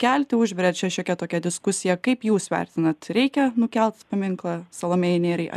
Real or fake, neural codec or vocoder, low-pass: real; none; 14.4 kHz